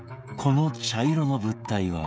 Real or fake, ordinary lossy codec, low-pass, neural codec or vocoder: fake; none; none; codec, 16 kHz, 16 kbps, FreqCodec, smaller model